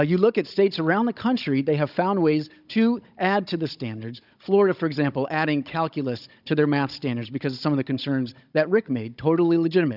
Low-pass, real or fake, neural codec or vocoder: 5.4 kHz; fake; codec, 16 kHz, 16 kbps, FunCodec, trained on Chinese and English, 50 frames a second